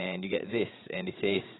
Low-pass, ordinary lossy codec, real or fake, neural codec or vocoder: 7.2 kHz; AAC, 16 kbps; fake; codec, 16 kHz, 16 kbps, FreqCodec, larger model